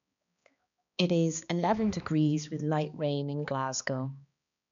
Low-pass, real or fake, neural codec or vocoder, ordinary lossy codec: 7.2 kHz; fake; codec, 16 kHz, 2 kbps, X-Codec, HuBERT features, trained on balanced general audio; none